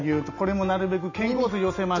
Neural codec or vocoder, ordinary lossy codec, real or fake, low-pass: none; none; real; 7.2 kHz